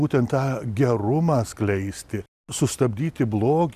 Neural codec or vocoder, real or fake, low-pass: none; real; 14.4 kHz